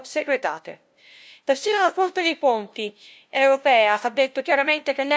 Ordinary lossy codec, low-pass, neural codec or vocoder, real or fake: none; none; codec, 16 kHz, 0.5 kbps, FunCodec, trained on LibriTTS, 25 frames a second; fake